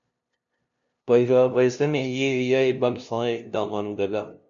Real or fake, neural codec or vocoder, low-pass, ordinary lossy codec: fake; codec, 16 kHz, 0.5 kbps, FunCodec, trained on LibriTTS, 25 frames a second; 7.2 kHz; MP3, 96 kbps